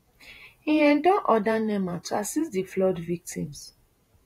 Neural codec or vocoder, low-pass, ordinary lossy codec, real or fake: vocoder, 48 kHz, 128 mel bands, Vocos; 19.8 kHz; AAC, 48 kbps; fake